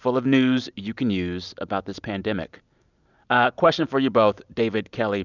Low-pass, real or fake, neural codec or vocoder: 7.2 kHz; real; none